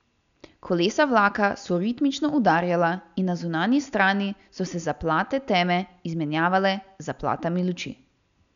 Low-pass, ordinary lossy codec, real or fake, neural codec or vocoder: 7.2 kHz; none; real; none